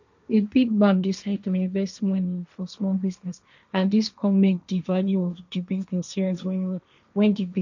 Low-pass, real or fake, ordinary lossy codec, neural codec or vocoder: 7.2 kHz; fake; none; codec, 16 kHz, 1.1 kbps, Voila-Tokenizer